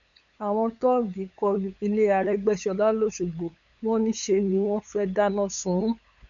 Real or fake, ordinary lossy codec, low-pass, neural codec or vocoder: fake; none; 7.2 kHz; codec, 16 kHz, 8 kbps, FunCodec, trained on LibriTTS, 25 frames a second